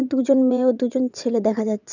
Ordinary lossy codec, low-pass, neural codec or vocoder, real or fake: none; 7.2 kHz; vocoder, 22.05 kHz, 80 mel bands, WaveNeXt; fake